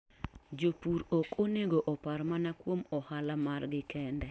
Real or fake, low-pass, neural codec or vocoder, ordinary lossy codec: real; none; none; none